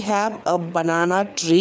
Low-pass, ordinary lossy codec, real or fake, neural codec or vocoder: none; none; fake; codec, 16 kHz, 4 kbps, FreqCodec, larger model